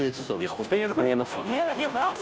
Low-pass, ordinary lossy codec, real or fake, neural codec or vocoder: none; none; fake; codec, 16 kHz, 0.5 kbps, FunCodec, trained on Chinese and English, 25 frames a second